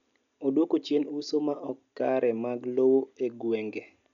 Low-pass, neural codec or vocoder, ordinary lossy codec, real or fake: 7.2 kHz; none; none; real